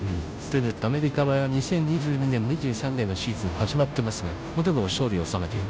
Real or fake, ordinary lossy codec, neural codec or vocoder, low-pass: fake; none; codec, 16 kHz, 0.5 kbps, FunCodec, trained on Chinese and English, 25 frames a second; none